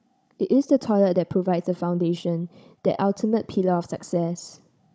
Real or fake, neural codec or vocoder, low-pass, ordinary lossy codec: fake; codec, 16 kHz, 16 kbps, FunCodec, trained on Chinese and English, 50 frames a second; none; none